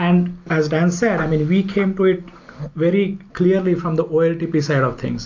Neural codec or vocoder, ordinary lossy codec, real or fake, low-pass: none; AAC, 48 kbps; real; 7.2 kHz